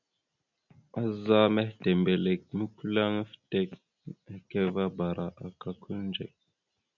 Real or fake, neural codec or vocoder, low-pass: real; none; 7.2 kHz